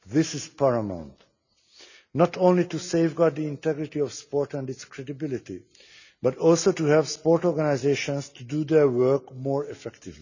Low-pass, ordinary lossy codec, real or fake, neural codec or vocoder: 7.2 kHz; none; real; none